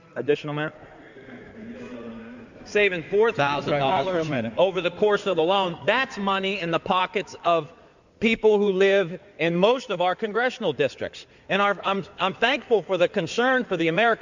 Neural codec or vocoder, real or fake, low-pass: codec, 16 kHz in and 24 kHz out, 2.2 kbps, FireRedTTS-2 codec; fake; 7.2 kHz